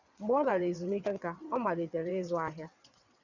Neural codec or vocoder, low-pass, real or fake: vocoder, 22.05 kHz, 80 mel bands, WaveNeXt; 7.2 kHz; fake